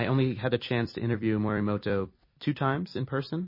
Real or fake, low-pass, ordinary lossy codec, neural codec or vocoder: fake; 5.4 kHz; MP3, 24 kbps; vocoder, 44.1 kHz, 128 mel bands every 256 samples, BigVGAN v2